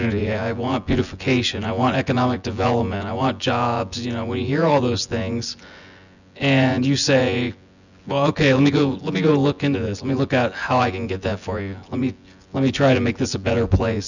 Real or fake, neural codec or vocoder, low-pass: fake; vocoder, 24 kHz, 100 mel bands, Vocos; 7.2 kHz